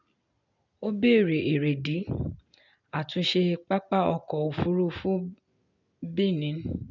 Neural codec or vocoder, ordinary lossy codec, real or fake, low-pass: none; none; real; 7.2 kHz